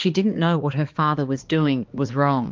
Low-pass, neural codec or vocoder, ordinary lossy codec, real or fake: 7.2 kHz; codec, 16 kHz, 2 kbps, X-Codec, HuBERT features, trained on balanced general audio; Opus, 32 kbps; fake